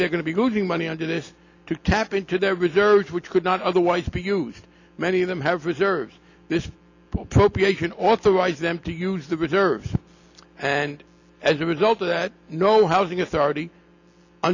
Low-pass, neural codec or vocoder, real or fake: 7.2 kHz; none; real